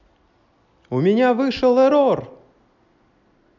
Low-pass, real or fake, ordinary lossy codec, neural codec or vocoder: 7.2 kHz; real; none; none